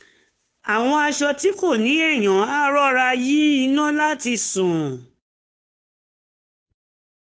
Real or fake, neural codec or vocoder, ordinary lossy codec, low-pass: fake; codec, 16 kHz, 2 kbps, FunCodec, trained on Chinese and English, 25 frames a second; none; none